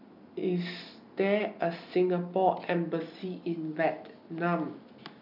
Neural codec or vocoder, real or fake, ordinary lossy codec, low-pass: none; real; none; 5.4 kHz